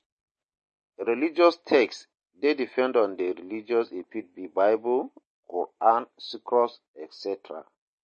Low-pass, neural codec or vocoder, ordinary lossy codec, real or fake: 10.8 kHz; none; MP3, 32 kbps; real